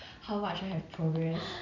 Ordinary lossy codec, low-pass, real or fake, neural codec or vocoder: none; 7.2 kHz; fake; vocoder, 44.1 kHz, 128 mel bands every 256 samples, BigVGAN v2